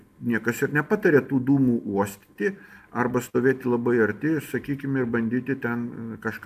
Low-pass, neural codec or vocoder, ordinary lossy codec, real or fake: 14.4 kHz; none; AAC, 96 kbps; real